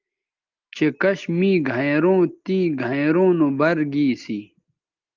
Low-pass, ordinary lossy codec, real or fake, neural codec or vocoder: 7.2 kHz; Opus, 32 kbps; real; none